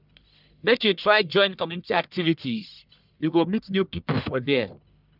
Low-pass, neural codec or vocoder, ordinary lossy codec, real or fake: 5.4 kHz; codec, 44.1 kHz, 1.7 kbps, Pupu-Codec; none; fake